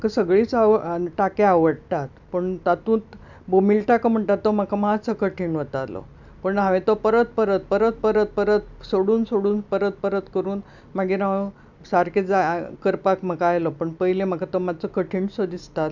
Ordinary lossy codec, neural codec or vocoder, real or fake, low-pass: none; none; real; 7.2 kHz